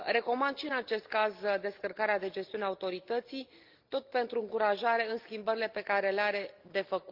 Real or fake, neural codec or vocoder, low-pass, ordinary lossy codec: real; none; 5.4 kHz; Opus, 24 kbps